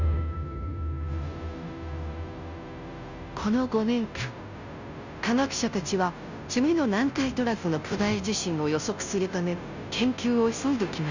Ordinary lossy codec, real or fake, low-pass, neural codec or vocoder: none; fake; 7.2 kHz; codec, 16 kHz, 0.5 kbps, FunCodec, trained on Chinese and English, 25 frames a second